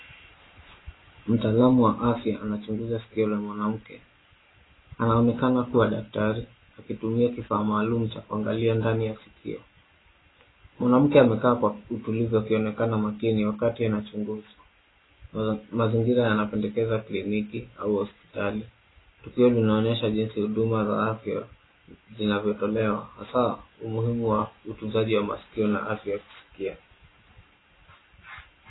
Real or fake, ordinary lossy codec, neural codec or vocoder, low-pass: real; AAC, 16 kbps; none; 7.2 kHz